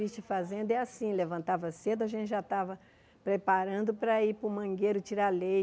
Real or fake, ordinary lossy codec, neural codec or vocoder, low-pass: real; none; none; none